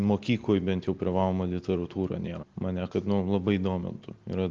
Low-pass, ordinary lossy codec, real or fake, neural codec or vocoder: 7.2 kHz; Opus, 32 kbps; real; none